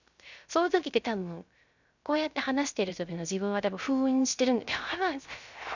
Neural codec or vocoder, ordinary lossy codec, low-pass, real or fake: codec, 16 kHz, 0.3 kbps, FocalCodec; none; 7.2 kHz; fake